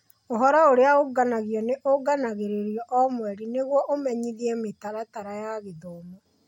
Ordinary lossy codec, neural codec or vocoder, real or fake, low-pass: MP3, 64 kbps; none; real; 19.8 kHz